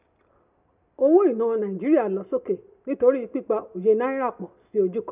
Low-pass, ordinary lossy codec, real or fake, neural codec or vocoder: 3.6 kHz; none; real; none